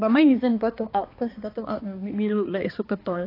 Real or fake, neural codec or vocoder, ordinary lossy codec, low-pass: fake; codec, 16 kHz, 2 kbps, X-Codec, HuBERT features, trained on balanced general audio; AAC, 24 kbps; 5.4 kHz